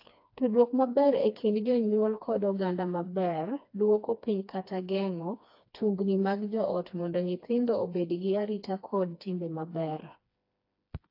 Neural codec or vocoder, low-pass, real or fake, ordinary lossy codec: codec, 16 kHz, 2 kbps, FreqCodec, smaller model; 5.4 kHz; fake; AAC, 32 kbps